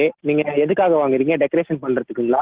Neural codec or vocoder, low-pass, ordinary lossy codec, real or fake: none; 3.6 kHz; Opus, 16 kbps; real